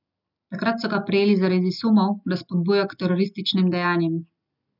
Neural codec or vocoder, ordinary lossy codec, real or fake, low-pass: none; none; real; 5.4 kHz